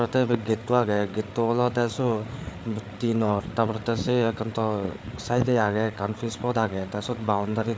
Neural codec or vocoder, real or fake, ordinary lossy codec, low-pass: codec, 16 kHz, 8 kbps, FreqCodec, larger model; fake; none; none